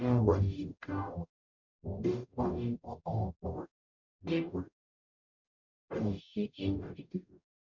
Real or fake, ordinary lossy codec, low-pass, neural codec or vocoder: fake; none; 7.2 kHz; codec, 44.1 kHz, 0.9 kbps, DAC